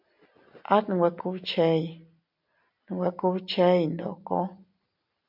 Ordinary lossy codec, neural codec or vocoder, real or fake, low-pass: MP3, 48 kbps; none; real; 5.4 kHz